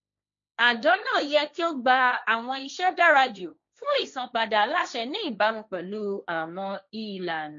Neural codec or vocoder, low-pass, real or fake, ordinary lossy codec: codec, 16 kHz, 1.1 kbps, Voila-Tokenizer; 7.2 kHz; fake; MP3, 48 kbps